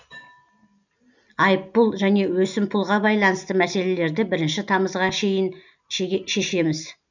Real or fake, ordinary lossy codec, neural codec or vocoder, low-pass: real; none; none; 7.2 kHz